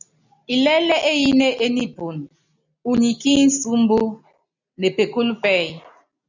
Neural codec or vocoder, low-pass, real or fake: none; 7.2 kHz; real